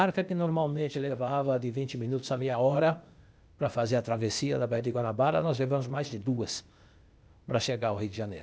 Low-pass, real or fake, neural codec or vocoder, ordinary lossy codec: none; fake; codec, 16 kHz, 0.8 kbps, ZipCodec; none